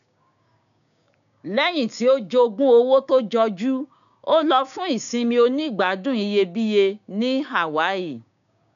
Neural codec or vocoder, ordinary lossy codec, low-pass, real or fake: codec, 16 kHz, 6 kbps, DAC; none; 7.2 kHz; fake